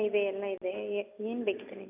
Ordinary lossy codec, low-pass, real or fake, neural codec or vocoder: none; 3.6 kHz; real; none